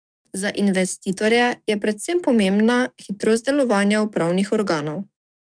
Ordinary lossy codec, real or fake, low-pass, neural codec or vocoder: none; fake; 9.9 kHz; codec, 44.1 kHz, 7.8 kbps, DAC